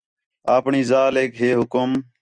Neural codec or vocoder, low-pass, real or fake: none; 9.9 kHz; real